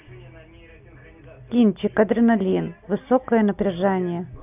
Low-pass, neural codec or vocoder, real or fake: 3.6 kHz; none; real